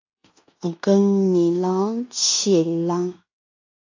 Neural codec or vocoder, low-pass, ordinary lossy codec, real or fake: codec, 16 kHz in and 24 kHz out, 0.9 kbps, LongCat-Audio-Codec, fine tuned four codebook decoder; 7.2 kHz; AAC, 48 kbps; fake